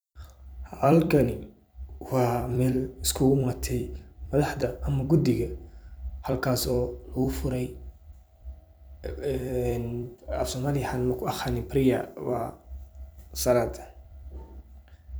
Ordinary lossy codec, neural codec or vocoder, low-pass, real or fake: none; vocoder, 44.1 kHz, 128 mel bands every 256 samples, BigVGAN v2; none; fake